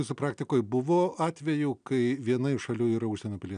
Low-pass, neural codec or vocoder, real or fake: 9.9 kHz; none; real